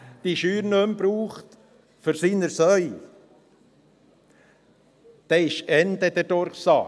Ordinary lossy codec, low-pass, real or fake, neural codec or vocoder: none; none; real; none